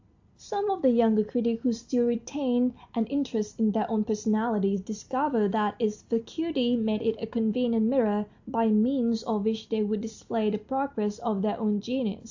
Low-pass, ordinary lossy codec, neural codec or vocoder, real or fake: 7.2 kHz; AAC, 48 kbps; none; real